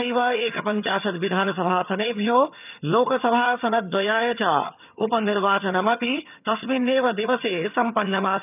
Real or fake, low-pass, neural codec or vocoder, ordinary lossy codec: fake; 3.6 kHz; vocoder, 22.05 kHz, 80 mel bands, HiFi-GAN; none